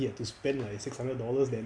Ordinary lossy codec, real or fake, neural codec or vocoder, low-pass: MP3, 64 kbps; real; none; 9.9 kHz